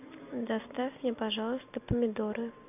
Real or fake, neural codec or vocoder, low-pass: real; none; 3.6 kHz